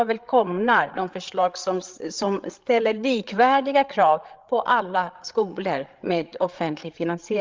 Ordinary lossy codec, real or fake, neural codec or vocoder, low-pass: Opus, 32 kbps; fake; codec, 16 kHz, 8 kbps, FreqCodec, larger model; 7.2 kHz